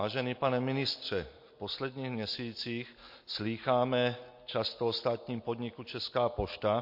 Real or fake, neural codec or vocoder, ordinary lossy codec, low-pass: real; none; MP3, 32 kbps; 5.4 kHz